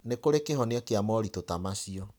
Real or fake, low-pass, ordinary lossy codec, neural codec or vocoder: real; none; none; none